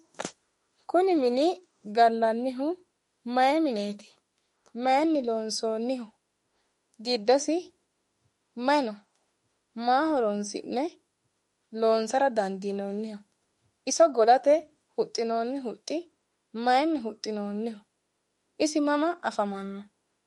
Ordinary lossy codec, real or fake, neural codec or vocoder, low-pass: MP3, 48 kbps; fake; autoencoder, 48 kHz, 32 numbers a frame, DAC-VAE, trained on Japanese speech; 19.8 kHz